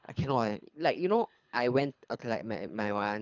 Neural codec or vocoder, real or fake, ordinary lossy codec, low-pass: codec, 24 kHz, 3 kbps, HILCodec; fake; none; 7.2 kHz